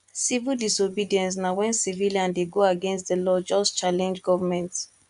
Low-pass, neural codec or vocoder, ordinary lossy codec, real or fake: 10.8 kHz; vocoder, 24 kHz, 100 mel bands, Vocos; none; fake